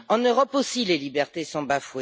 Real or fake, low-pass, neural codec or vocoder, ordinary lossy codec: real; none; none; none